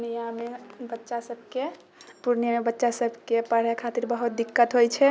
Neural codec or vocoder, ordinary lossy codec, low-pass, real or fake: none; none; none; real